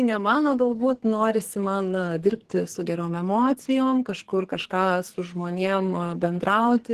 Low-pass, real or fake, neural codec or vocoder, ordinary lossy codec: 14.4 kHz; fake; codec, 44.1 kHz, 2.6 kbps, SNAC; Opus, 16 kbps